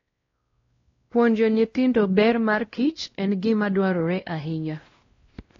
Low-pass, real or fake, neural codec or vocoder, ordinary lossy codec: 7.2 kHz; fake; codec, 16 kHz, 1 kbps, X-Codec, WavLM features, trained on Multilingual LibriSpeech; AAC, 32 kbps